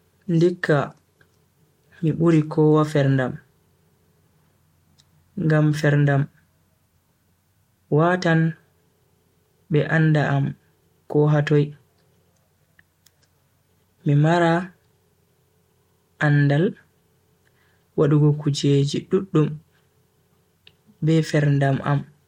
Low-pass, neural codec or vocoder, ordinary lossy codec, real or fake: 19.8 kHz; none; MP3, 64 kbps; real